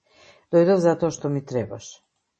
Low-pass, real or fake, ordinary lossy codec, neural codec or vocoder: 10.8 kHz; real; MP3, 32 kbps; none